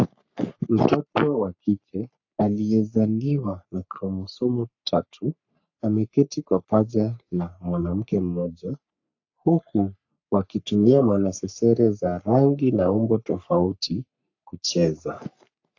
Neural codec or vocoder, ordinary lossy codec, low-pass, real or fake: codec, 44.1 kHz, 3.4 kbps, Pupu-Codec; AAC, 48 kbps; 7.2 kHz; fake